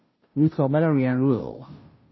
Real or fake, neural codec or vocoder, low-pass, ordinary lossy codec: fake; codec, 16 kHz, 0.5 kbps, FunCodec, trained on Chinese and English, 25 frames a second; 7.2 kHz; MP3, 24 kbps